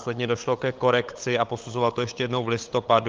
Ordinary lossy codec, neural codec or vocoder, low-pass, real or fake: Opus, 32 kbps; codec, 16 kHz, 8 kbps, FunCodec, trained on LibriTTS, 25 frames a second; 7.2 kHz; fake